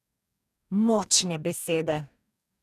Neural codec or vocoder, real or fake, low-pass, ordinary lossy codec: codec, 44.1 kHz, 2.6 kbps, DAC; fake; 14.4 kHz; none